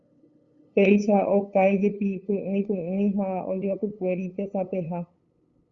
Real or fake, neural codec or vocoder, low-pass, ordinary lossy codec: fake; codec, 16 kHz, 8 kbps, FunCodec, trained on LibriTTS, 25 frames a second; 7.2 kHz; Opus, 64 kbps